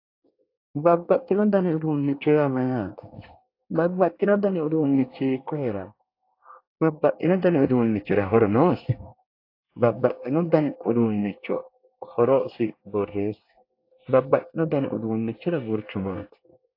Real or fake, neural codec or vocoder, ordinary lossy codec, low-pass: fake; codec, 24 kHz, 1 kbps, SNAC; AAC, 32 kbps; 5.4 kHz